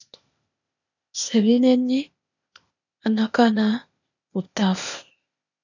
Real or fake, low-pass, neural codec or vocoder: fake; 7.2 kHz; codec, 16 kHz, 0.8 kbps, ZipCodec